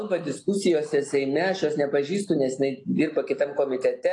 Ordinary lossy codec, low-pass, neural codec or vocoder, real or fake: AAC, 48 kbps; 10.8 kHz; none; real